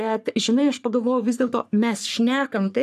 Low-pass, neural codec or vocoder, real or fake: 14.4 kHz; codec, 44.1 kHz, 3.4 kbps, Pupu-Codec; fake